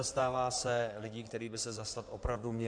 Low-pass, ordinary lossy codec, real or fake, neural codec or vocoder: 9.9 kHz; MP3, 96 kbps; fake; codec, 16 kHz in and 24 kHz out, 2.2 kbps, FireRedTTS-2 codec